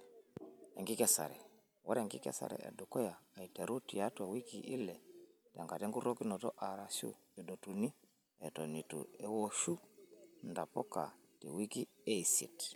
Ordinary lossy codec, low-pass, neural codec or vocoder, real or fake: none; none; none; real